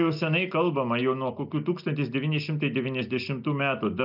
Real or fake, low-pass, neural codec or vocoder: real; 5.4 kHz; none